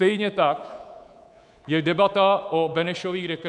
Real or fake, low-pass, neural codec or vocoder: fake; 10.8 kHz; codec, 24 kHz, 3.1 kbps, DualCodec